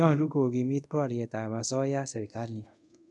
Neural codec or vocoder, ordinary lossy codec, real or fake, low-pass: codec, 24 kHz, 0.5 kbps, DualCodec; none; fake; 10.8 kHz